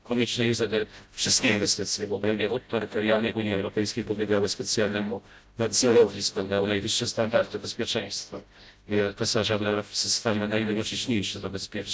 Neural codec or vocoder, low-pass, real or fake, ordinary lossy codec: codec, 16 kHz, 0.5 kbps, FreqCodec, smaller model; none; fake; none